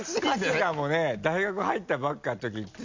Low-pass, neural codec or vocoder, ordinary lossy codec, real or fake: 7.2 kHz; none; MP3, 64 kbps; real